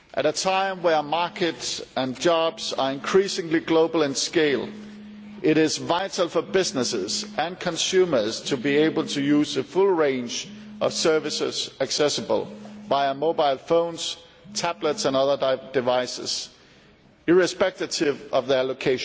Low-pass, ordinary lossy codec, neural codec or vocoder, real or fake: none; none; none; real